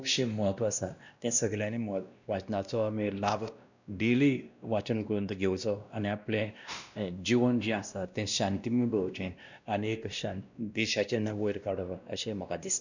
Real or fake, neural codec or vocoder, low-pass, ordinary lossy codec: fake; codec, 16 kHz, 1 kbps, X-Codec, WavLM features, trained on Multilingual LibriSpeech; 7.2 kHz; none